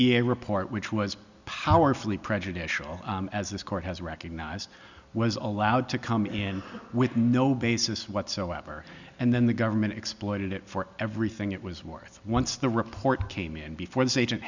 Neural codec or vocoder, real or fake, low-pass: none; real; 7.2 kHz